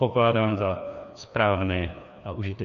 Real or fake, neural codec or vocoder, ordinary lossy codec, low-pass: fake; codec, 16 kHz, 2 kbps, FreqCodec, larger model; MP3, 64 kbps; 7.2 kHz